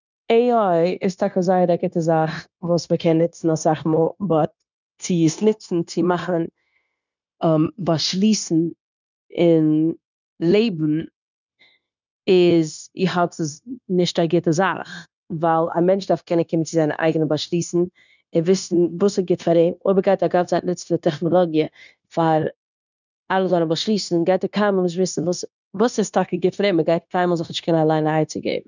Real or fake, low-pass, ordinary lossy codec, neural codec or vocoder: fake; 7.2 kHz; none; codec, 16 kHz, 0.9 kbps, LongCat-Audio-Codec